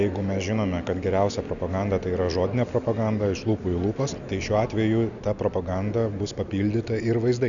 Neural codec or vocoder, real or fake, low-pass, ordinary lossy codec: none; real; 7.2 kHz; MP3, 96 kbps